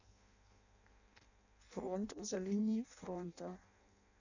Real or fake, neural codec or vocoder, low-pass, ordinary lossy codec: fake; codec, 16 kHz in and 24 kHz out, 0.6 kbps, FireRedTTS-2 codec; 7.2 kHz; none